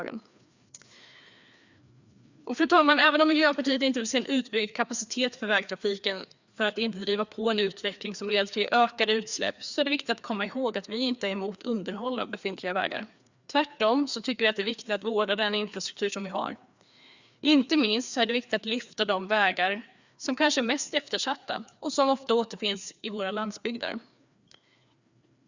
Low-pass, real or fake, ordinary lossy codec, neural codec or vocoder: 7.2 kHz; fake; Opus, 64 kbps; codec, 16 kHz, 2 kbps, FreqCodec, larger model